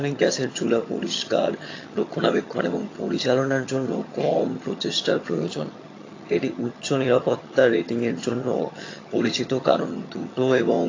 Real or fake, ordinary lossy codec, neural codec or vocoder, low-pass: fake; AAC, 32 kbps; vocoder, 22.05 kHz, 80 mel bands, HiFi-GAN; 7.2 kHz